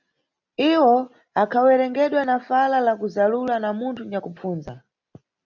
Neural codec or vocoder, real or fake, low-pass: none; real; 7.2 kHz